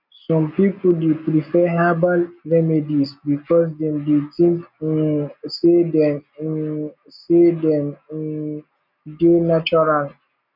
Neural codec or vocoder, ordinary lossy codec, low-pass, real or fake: none; none; 5.4 kHz; real